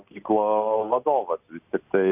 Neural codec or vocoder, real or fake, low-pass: none; real; 3.6 kHz